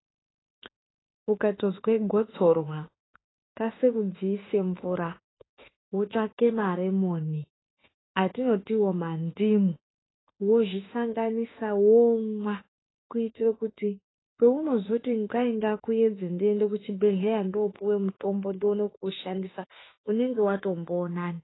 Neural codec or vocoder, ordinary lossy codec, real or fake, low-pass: autoencoder, 48 kHz, 32 numbers a frame, DAC-VAE, trained on Japanese speech; AAC, 16 kbps; fake; 7.2 kHz